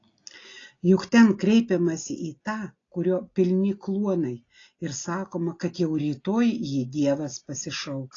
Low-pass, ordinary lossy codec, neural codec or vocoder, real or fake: 7.2 kHz; AAC, 32 kbps; none; real